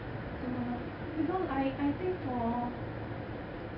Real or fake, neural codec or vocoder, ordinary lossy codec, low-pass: real; none; none; 5.4 kHz